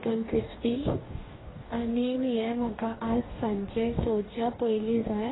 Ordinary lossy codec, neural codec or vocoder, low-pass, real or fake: AAC, 16 kbps; codec, 44.1 kHz, 2.6 kbps, DAC; 7.2 kHz; fake